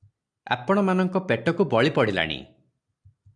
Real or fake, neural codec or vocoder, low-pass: real; none; 9.9 kHz